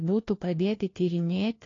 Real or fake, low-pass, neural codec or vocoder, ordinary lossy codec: fake; 7.2 kHz; codec, 16 kHz, 1 kbps, FunCodec, trained on LibriTTS, 50 frames a second; AAC, 32 kbps